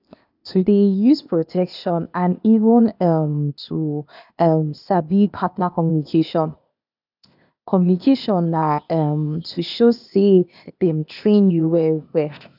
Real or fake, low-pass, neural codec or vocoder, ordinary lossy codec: fake; 5.4 kHz; codec, 16 kHz, 0.8 kbps, ZipCodec; none